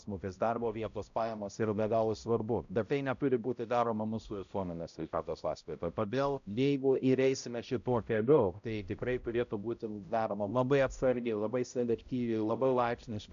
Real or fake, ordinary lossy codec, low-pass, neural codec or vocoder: fake; MP3, 96 kbps; 7.2 kHz; codec, 16 kHz, 0.5 kbps, X-Codec, HuBERT features, trained on balanced general audio